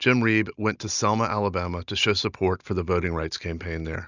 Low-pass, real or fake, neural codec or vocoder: 7.2 kHz; real; none